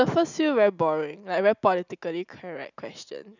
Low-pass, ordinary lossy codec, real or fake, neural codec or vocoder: 7.2 kHz; none; real; none